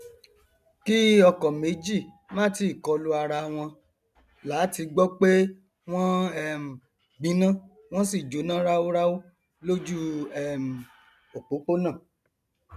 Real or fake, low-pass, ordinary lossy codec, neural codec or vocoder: real; 14.4 kHz; none; none